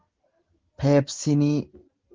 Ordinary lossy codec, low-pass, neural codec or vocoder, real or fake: Opus, 16 kbps; 7.2 kHz; none; real